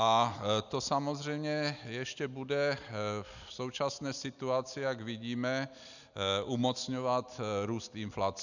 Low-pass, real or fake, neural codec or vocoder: 7.2 kHz; real; none